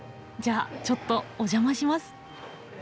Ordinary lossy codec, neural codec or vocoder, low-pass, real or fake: none; none; none; real